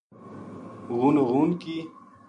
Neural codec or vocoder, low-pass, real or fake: none; 9.9 kHz; real